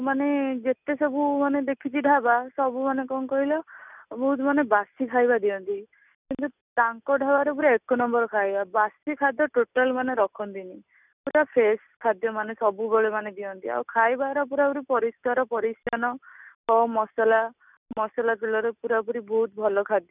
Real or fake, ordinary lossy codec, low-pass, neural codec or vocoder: real; none; 3.6 kHz; none